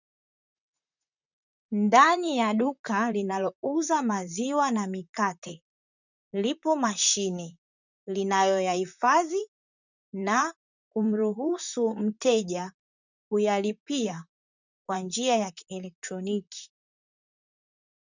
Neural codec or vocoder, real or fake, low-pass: vocoder, 44.1 kHz, 128 mel bands, Pupu-Vocoder; fake; 7.2 kHz